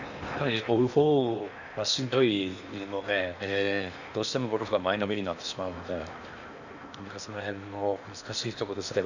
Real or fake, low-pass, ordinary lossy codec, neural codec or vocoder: fake; 7.2 kHz; none; codec, 16 kHz in and 24 kHz out, 0.8 kbps, FocalCodec, streaming, 65536 codes